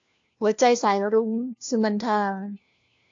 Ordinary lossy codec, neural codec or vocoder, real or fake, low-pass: AAC, 48 kbps; codec, 16 kHz, 1 kbps, FunCodec, trained on LibriTTS, 50 frames a second; fake; 7.2 kHz